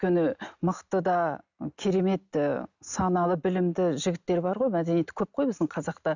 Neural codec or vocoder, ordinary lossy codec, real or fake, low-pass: none; none; real; 7.2 kHz